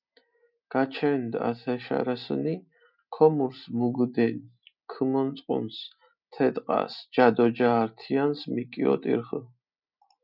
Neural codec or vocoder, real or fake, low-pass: none; real; 5.4 kHz